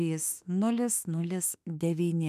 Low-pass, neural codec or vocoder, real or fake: 14.4 kHz; autoencoder, 48 kHz, 32 numbers a frame, DAC-VAE, trained on Japanese speech; fake